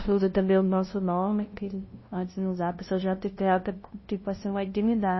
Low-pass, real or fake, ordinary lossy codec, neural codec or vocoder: 7.2 kHz; fake; MP3, 24 kbps; codec, 16 kHz, 0.5 kbps, FunCodec, trained on LibriTTS, 25 frames a second